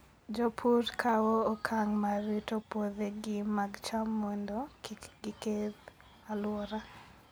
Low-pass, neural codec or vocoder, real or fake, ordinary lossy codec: none; none; real; none